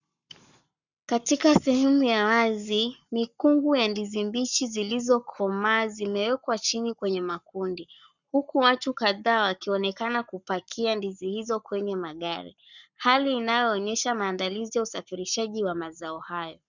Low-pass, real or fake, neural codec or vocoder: 7.2 kHz; fake; codec, 44.1 kHz, 7.8 kbps, Pupu-Codec